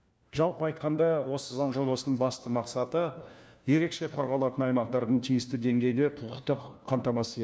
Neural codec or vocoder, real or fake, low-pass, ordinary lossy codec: codec, 16 kHz, 1 kbps, FunCodec, trained on LibriTTS, 50 frames a second; fake; none; none